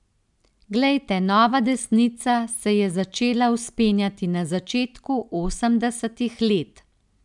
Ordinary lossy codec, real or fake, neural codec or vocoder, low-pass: none; real; none; 10.8 kHz